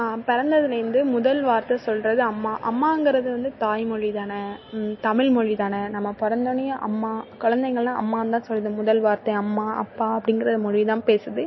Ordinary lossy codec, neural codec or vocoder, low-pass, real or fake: MP3, 24 kbps; none; 7.2 kHz; real